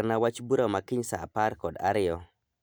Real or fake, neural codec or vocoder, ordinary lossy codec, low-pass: real; none; none; none